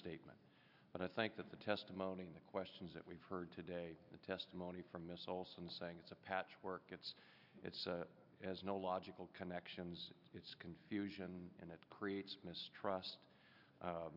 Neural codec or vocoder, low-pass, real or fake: none; 5.4 kHz; real